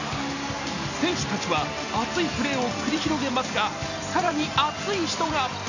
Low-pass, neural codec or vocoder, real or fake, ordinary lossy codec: 7.2 kHz; none; real; none